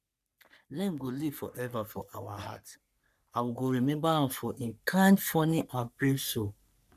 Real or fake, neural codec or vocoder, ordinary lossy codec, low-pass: fake; codec, 44.1 kHz, 3.4 kbps, Pupu-Codec; none; 14.4 kHz